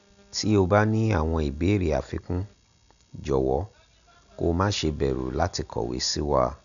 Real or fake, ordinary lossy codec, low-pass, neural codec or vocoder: real; none; 7.2 kHz; none